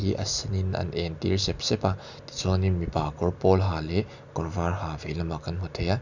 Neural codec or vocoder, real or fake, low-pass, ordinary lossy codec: none; real; 7.2 kHz; none